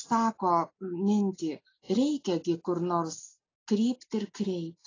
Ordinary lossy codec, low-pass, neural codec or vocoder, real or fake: AAC, 32 kbps; 7.2 kHz; none; real